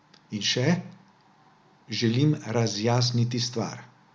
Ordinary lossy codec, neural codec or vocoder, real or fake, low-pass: none; none; real; none